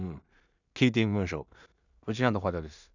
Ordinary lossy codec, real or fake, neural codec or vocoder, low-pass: none; fake; codec, 16 kHz in and 24 kHz out, 0.4 kbps, LongCat-Audio-Codec, two codebook decoder; 7.2 kHz